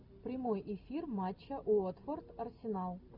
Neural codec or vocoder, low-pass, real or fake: none; 5.4 kHz; real